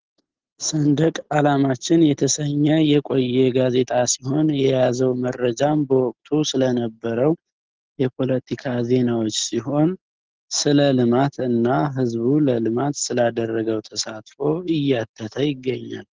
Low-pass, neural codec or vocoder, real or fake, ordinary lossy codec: 7.2 kHz; none; real; Opus, 16 kbps